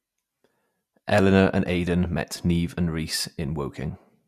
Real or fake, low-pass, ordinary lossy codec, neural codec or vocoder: real; 14.4 kHz; MP3, 96 kbps; none